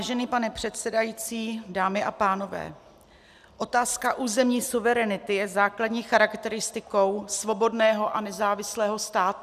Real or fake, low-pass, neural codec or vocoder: real; 14.4 kHz; none